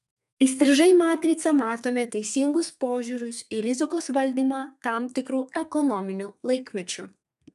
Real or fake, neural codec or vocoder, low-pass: fake; codec, 32 kHz, 1.9 kbps, SNAC; 14.4 kHz